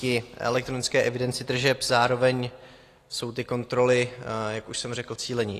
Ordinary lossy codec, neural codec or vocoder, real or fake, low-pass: AAC, 48 kbps; none; real; 14.4 kHz